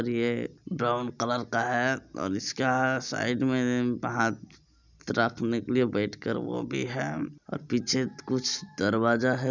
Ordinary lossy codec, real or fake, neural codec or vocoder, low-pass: none; real; none; 7.2 kHz